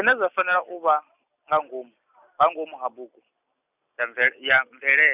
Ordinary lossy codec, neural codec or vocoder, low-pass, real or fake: none; none; 3.6 kHz; real